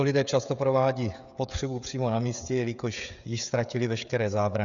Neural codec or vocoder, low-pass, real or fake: codec, 16 kHz, 16 kbps, FreqCodec, smaller model; 7.2 kHz; fake